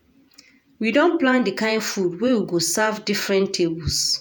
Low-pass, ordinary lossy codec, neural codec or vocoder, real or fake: none; none; vocoder, 48 kHz, 128 mel bands, Vocos; fake